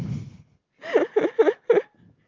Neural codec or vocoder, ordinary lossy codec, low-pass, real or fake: none; Opus, 32 kbps; 7.2 kHz; real